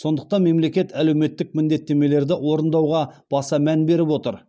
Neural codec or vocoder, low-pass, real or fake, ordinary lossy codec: none; none; real; none